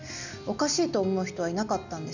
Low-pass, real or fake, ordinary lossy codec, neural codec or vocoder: 7.2 kHz; real; none; none